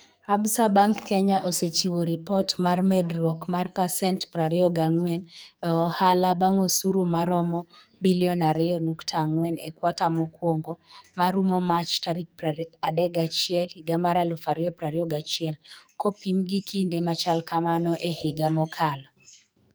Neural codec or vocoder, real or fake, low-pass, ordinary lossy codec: codec, 44.1 kHz, 2.6 kbps, SNAC; fake; none; none